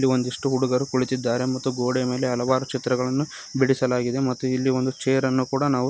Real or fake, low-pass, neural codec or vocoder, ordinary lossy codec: real; none; none; none